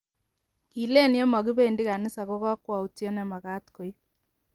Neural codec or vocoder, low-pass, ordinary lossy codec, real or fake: none; 19.8 kHz; Opus, 24 kbps; real